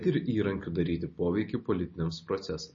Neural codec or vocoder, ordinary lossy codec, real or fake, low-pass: none; MP3, 32 kbps; real; 7.2 kHz